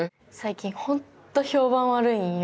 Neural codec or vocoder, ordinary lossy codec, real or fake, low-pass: none; none; real; none